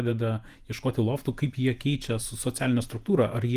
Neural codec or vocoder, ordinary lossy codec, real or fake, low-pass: vocoder, 48 kHz, 128 mel bands, Vocos; Opus, 24 kbps; fake; 14.4 kHz